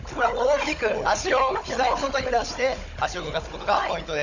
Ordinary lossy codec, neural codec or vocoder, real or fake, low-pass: none; codec, 16 kHz, 16 kbps, FunCodec, trained on Chinese and English, 50 frames a second; fake; 7.2 kHz